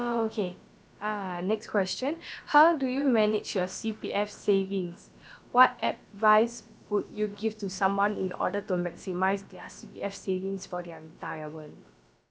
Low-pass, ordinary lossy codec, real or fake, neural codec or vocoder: none; none; fake; codec, 16 kHz, about 1 kbps, DyCAST, with the encoder's durations